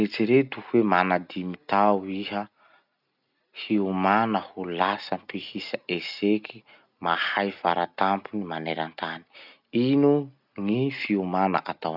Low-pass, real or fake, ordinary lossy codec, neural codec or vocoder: 5.4 kHz; real; none; none